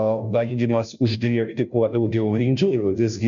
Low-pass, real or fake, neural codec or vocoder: 7.2 kHz; fake; codec, 16 kHz, 0.5 kbps, FunCodec, trained on Chinese and English, 25 frames a second